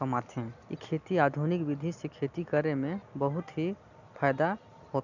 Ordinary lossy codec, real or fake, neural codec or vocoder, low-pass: none; real; none; 7.2 kHz